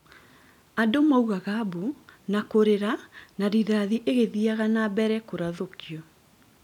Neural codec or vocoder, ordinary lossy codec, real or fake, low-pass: none; none; real; 19.8 kHz